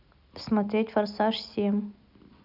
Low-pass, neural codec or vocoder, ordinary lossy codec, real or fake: 5.4 kHz; none; none; real